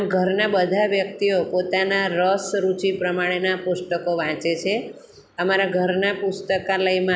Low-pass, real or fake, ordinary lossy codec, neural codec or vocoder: none; real; none; none